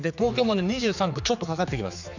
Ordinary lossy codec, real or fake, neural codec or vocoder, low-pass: none; fake; codec, 16 kHz, 4 kbps, X-Codec, HuBERT features, trained on general audio; 7.2 kHz